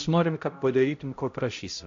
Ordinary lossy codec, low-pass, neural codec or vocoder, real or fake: AAC, 48 kbps; 7.2 kHz; codec, 16 kHz, 0.5 kbps, X-Codec, HuBERT features, trained on balanced general audio; fake